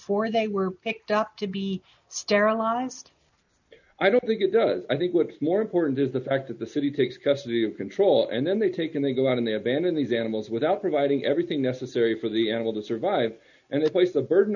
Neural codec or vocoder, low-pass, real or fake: none; 7.2 kHz; real